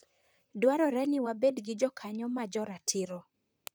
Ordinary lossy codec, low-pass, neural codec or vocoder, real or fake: none; none; vocoder, 44.1 kHz, 128 mel bands, Pupu-Vocoder; fake